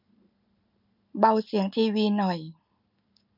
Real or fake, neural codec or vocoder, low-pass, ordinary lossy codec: real; none; 5.4 kHz; none